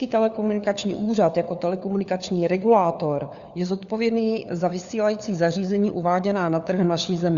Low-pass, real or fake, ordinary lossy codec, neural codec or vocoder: 7.2 kHz; fake; Opus, 64 kbps; codec, 16 kHz, 4 kbps, FunCodec, trained on LibriTTS, 50 frames a second